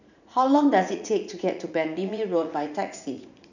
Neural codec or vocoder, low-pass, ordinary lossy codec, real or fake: vocoder, 44.1 kHz, 80 mel bands, Vocos; 7.2 kHz; none; fake